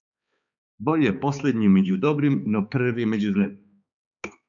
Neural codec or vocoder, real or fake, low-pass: codec, 16 kHz, 4 kbps, X-Codec, HuBERT features, trained on balanced general audio; fake; 7.2 kHz